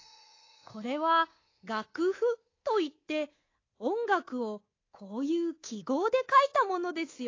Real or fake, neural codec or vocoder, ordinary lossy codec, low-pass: fake; codec, 24 kHz, 3.1 kbps, DualCodec; AAC, 32 kbps; 7.2 kHz